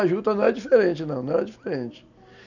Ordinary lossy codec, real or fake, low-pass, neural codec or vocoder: none; real; 7.2 kHz; none